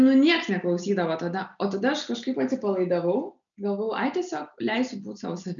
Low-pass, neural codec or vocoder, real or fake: 7.2 kHz; none; real